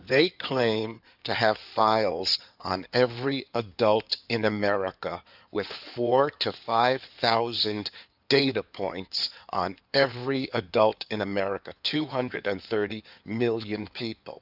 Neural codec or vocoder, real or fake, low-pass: codec, 16 kHz in and 24 kHz out, 2.2 kbps, FireRedTTS-2 codec; fake; 5.4 kHz